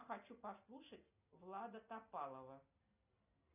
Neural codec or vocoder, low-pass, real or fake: none; 3.6 kHz; real